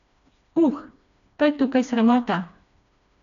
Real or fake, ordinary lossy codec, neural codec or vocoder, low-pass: fake; none; codec, 16 kHz, 2 kbps, FreqCodec, smaller model; 7.2 kHz